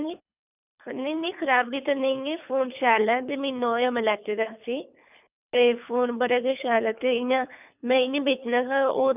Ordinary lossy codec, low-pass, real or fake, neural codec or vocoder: none; 3.6 kHz; fake; codec, 24 kHz, 3 kbps, HILCodec